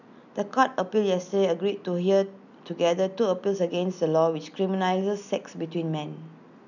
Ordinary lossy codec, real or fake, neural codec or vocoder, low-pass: none; real; none; 7.2 kHz